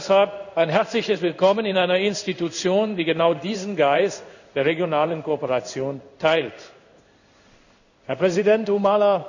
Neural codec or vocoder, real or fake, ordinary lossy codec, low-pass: codec, 16 kHz in and 24 kHz out, 1 kbps, XY-Tokenizer; fake; none; 7.2 kHz